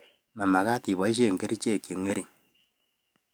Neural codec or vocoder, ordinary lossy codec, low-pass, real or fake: codec, 44.1 kHz, 7.8 kbps, DAC; none; none; fake